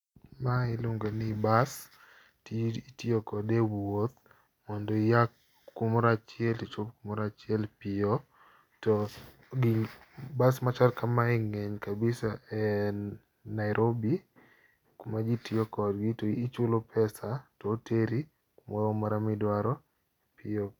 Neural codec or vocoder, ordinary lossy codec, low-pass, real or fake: none; none; 19.8 kHz; real